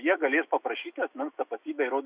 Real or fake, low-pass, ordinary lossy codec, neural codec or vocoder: fake; 3.6 kHz; Opus, 64 kbps; autoencoder, 48 kHz, 128 numbers a frame, DAC-VAE, trained on Japanese speech